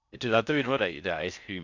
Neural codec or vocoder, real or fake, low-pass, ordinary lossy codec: codec, 16 kHz in and 24 kHz out, 0.6 kbps, FocalCodec, streaming, 4096 codes; fake; 7.2 kHz; none